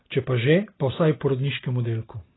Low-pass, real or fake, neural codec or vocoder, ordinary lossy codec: 7.2 kHz; real; none; AAC, 16 kbps